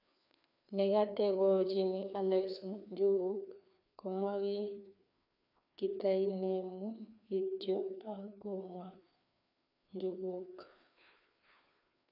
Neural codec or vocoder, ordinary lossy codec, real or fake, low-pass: codec, 16 kHz, 2 kbps, FreqCodec, larger model; none; fake; 5.4 kHz